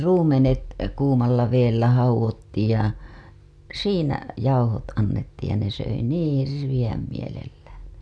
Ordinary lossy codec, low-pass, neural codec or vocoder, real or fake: none; none; none; real